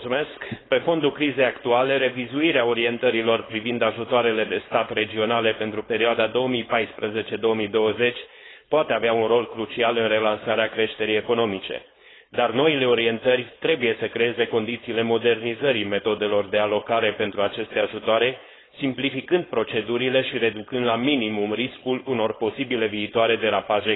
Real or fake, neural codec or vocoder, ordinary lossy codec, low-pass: fake; codec, 16 kHz, 4.8 kbps, FACodec; AAC, 16 kbps; 7.2 kHz